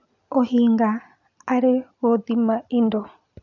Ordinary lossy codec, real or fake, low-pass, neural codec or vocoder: none; real; 7.2 kHz; none